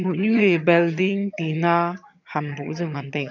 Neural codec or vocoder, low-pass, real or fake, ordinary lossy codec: vocoder, 22.05 kHz, 80 mel bands, HiFi-GAN; 7.2 kHz; fake; none